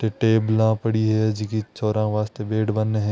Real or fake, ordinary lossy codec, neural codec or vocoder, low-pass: real; none; none; none